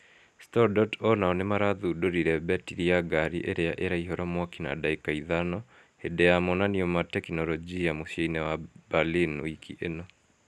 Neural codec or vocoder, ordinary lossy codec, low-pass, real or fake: none; none; none; real